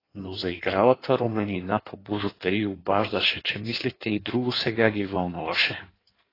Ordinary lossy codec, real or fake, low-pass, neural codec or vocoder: AAC, 24 kbps; fake; 5.4 kHz; codec, 16 kHz in and 24 kHz out, 1.1 kbps, FireRedTTS-2 codec